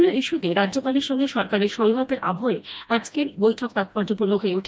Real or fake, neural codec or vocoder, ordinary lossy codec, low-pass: fake; codec, 16 kHz, 1 kbps, FreqCodec, smaller model; none; none